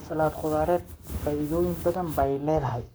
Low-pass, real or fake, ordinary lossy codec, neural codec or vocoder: none; fake; none; codec, 44.1 kHz, 7.8 kbps, Pupu-Codec